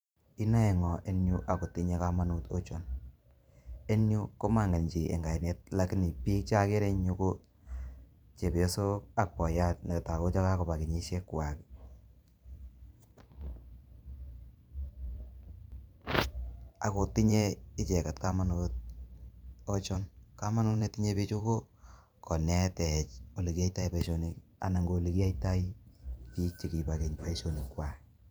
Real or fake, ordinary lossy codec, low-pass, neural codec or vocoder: fake; none; none; vocoder, 44.1 kHz, 128 mel bands every 512 samples, BigVGAN v2